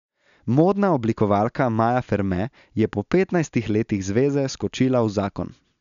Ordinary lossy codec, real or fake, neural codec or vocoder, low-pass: none; real; none; 7.2 kHz